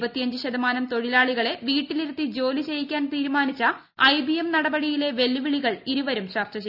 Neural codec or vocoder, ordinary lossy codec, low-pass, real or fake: none; none; 5.4 kHz; real